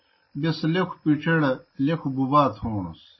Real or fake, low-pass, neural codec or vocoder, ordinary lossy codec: real; 7.2 kHz; none; MP3, 24 kbps